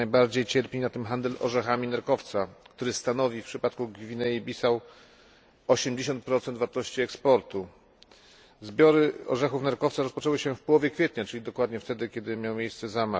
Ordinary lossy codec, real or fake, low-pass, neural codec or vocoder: none; real; none; none